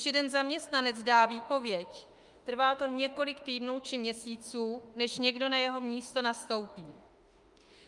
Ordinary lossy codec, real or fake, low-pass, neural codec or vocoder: Opus, 32 kbps; fake; 10.8 kHz; autoencoder, 48 kHz, 32 numbers a frame, DAC-VAE, trained on Japanese speech